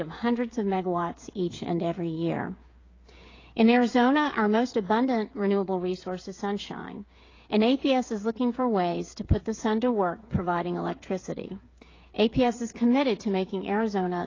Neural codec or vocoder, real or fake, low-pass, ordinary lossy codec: codec, 16 kHz, 8 kbps, FreqCodec, smaller model; fake; 7.2 kHz; AAC, 32 kbps